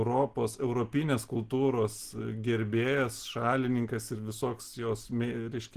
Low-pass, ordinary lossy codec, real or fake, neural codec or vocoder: 14.4 kHz; Opus, 16 kbps; real; none